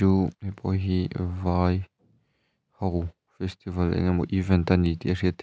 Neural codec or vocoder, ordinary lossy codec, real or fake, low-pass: none; none; real; none